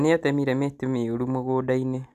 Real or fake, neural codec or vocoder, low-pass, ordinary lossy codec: real; none; 14.4 kHz; none